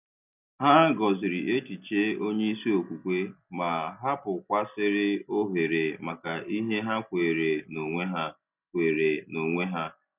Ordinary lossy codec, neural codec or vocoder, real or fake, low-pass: none; none; real; 3.6 kHz